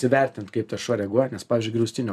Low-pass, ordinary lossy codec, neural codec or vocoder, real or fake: 14.4 kHz; MP3, 96 kbps; none; real